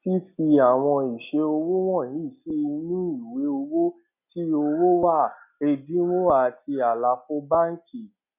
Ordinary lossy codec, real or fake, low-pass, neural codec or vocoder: none; real; 3.6 kHz; none